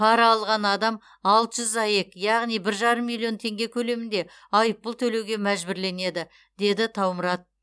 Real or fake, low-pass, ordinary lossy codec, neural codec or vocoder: real; none; none; none